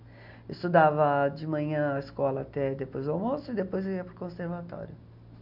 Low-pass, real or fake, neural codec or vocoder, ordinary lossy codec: 5.4 kHz; real; none; none